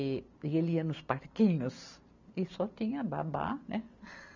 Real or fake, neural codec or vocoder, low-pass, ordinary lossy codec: real; none; 7.2 kHz; none